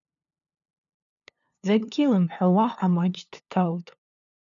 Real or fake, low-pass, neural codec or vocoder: fake; 7.2 kHz; codec, 16 kHz, 2 kbps, FunCodec, trained on LibriTTS, 25 frames a second